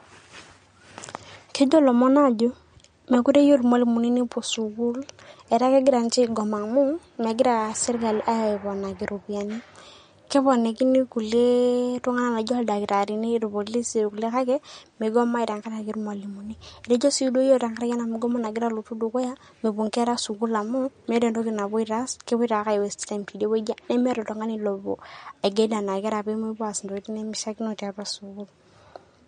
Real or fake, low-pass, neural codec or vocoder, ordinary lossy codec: real; 9.9 kHz; none; MP3, 48 kbps